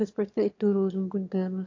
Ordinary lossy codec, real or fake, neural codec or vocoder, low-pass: AAC, 48 kbps; fake; autoencoder, 22.05 kHz, a latent of 192 numbers a frame, VITS, trained on one speaker; 7.2 kHz